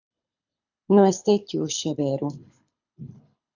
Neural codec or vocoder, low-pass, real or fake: codec, 24 kHz, 6 kbps, HILCodec; 7.2 kHz; fake